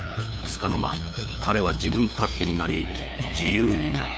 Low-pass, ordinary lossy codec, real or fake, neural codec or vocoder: none; none; fake; codec, 16 kHz, 2 kbps, FunCodec, trained on LibriTTS, 25 frames a second